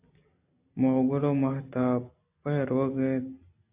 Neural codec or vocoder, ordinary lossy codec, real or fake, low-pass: none; AAC, 24 kbps; real; 3.6 kHz